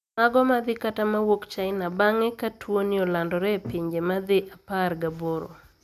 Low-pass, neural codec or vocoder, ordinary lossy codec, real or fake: 14.4 kHz; none; none; real